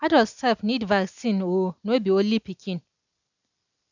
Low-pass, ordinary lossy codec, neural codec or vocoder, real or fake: 7.2 kHz; none; none; real